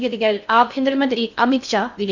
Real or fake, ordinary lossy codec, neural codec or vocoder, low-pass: fake; none; codec, 16 kHz in and 24 kHz out, 0.6 kbps, FocalCodec, streaming, 4096 codes; 7.2 kHz